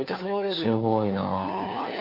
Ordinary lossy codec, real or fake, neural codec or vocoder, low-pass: MP3, 32 kbps; fake; codec, 16 kHz, 4 kbps, FunCodec, trained on LibriTTS, 50 frames a second; 5.4 kHz